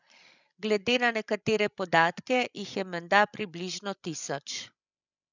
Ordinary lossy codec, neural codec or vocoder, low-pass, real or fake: none; codec, 16 kHz, 16 kbps, FreqCodec, larger model; 7.2 kHz; fake